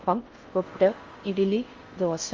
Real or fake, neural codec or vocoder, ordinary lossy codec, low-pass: fake; codec, 16 kHz in and 24 kHz out, 0.6 kbps, FocalCodec, streaming, 4096 codes; Opus, 32 kbps; 7.2 kHz